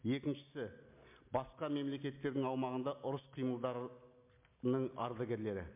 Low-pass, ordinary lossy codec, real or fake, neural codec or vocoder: 3.6 kHz; MP3, 32 kbps; real; none